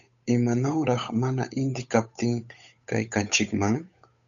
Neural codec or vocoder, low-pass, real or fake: codec, 16 kHz, 8 kbps, FunCodec, trained on Chinese and English, 25 frames a second; 7.2 kHz; fake